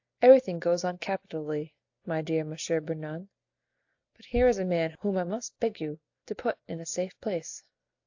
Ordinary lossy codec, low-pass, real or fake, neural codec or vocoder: AAC, 48 kbps; 7.2 kHz; real; none